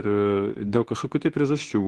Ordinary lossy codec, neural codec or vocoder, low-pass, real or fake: Opus, 16 kbps; codec, 24 kHz, 0.9 kbps, WavTokenizer, medium speech release version 2; 10.8 kHz; fake